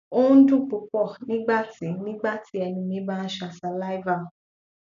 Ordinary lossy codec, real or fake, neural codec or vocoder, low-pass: none; real; none; 7.2 kHz